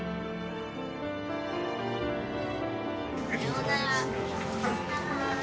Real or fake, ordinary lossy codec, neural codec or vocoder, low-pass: real; none; none; none